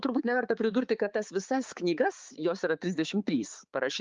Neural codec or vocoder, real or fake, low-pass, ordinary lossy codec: codec, 16 kHz, 4 kbps, X-Codec, HuBERT features, trained on balanced general audio; fake; 7.2 kHz; Opus, 32 kbps